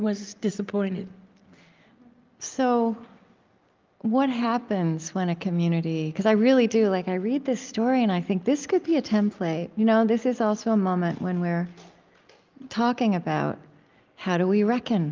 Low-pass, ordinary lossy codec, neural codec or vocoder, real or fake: 7.2 kHz; Opus, 32 kbps; none; real